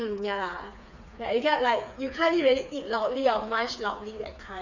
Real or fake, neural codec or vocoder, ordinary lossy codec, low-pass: fake; codec, 16 kHz, 4 kbps, FreqCodec, smaller model; none; 7.2 kHz